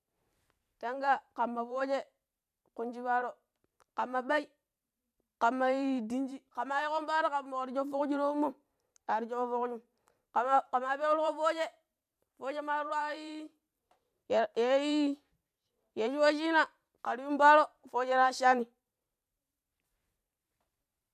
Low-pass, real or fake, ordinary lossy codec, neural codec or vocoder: 14.4 kHz; fake; MP3, 96 kbps; vocoder, 44.1 kHz, 128 mel bands every 512 samples, BigVGAN v2